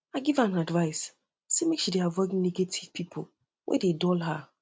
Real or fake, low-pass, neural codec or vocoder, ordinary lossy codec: real; none; none; none